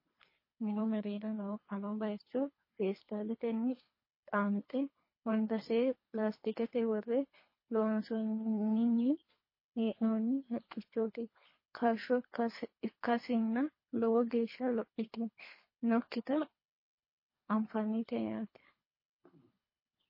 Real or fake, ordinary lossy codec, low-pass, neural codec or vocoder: fake; MP3, 24 kbps; 5.4 kHz; codec, 24 kHz, 3 kbps, HILCodec